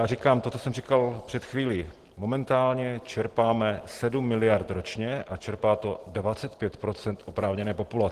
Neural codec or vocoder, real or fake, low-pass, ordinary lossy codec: none; real; 14.4 kHz; Opus, 16 kbps